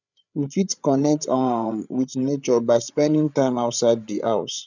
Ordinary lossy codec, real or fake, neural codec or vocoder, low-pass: none; fake; codec, 16 kHz, 8 kbps, FreqCodec, larger model; 7.2 kHz